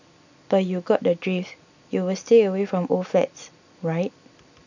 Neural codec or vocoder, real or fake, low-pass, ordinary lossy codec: none; real; 7.2 kHz; none